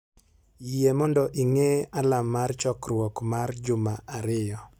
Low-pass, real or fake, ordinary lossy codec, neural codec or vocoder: none; real; none; none